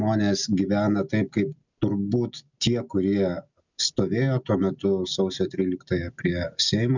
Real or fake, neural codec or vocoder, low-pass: real; none; 7.2 kHz